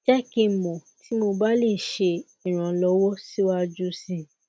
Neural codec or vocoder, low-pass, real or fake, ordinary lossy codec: none; none; real; none